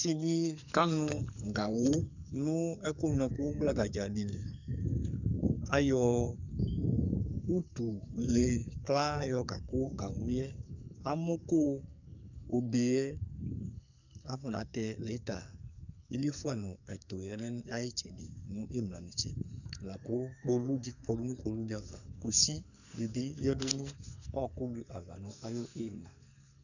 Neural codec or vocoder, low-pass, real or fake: codec, 44.1 kHz, 2.6 kbps, SNAC; 7.2 kHz; fake